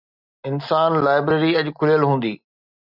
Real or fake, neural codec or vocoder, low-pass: real; none; 5.4 kHz